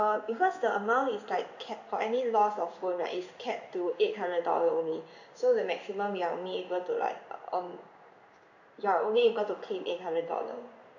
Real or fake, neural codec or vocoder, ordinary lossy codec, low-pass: fake; codec, 16 kHz in and 24 kHz out, 1 kbps, XY-Tokenizer; none; 7.2 kHz